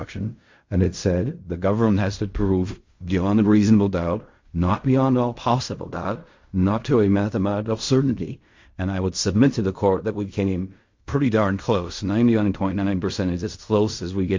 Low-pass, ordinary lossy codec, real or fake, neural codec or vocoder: 7.2 kHz; MP3, 48 kbps; fake; codec, 16 kHz in and 24 kHz out, 0.4 kbps, LongCat-Audio-Codec, fine tuned four codebook decoder